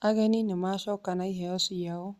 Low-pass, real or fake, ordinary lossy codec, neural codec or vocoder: 14.4 kHz; real; Opus, 64 kbps; none